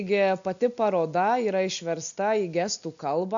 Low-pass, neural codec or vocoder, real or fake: 7.2 kHz; none; real